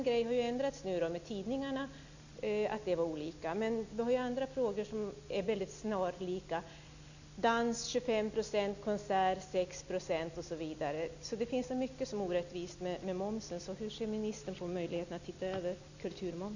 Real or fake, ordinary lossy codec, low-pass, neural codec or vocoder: real; none; 7.2 kHz; none